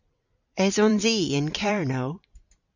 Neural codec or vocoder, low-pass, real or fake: vocoder, 44.1 kHz, 128 mel bands every 256 samples, BigVGAN v2; 7.2 kHz; fake